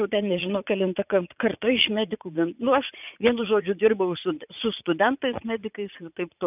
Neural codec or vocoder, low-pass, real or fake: vocoder, 44.1 kHz, 80 mel bands, Vocos; 3.6 kHz; fake